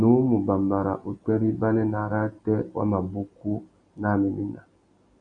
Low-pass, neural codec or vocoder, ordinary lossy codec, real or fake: 9.9 kHz; none; MP3, 48 kbps; real